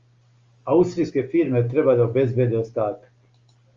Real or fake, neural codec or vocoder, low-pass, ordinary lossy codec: real; none; 7.2 kHz; Opus, 32 kbps